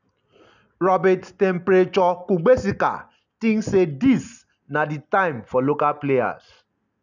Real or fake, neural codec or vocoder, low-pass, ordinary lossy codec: real; none; 7.2 kHz; none